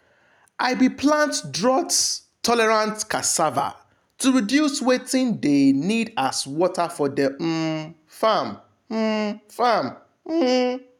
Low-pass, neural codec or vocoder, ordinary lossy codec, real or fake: 19.8 kHz; none; none; real